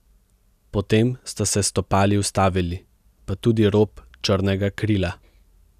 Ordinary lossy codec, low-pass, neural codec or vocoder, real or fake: none; 14.4 kHz; none; real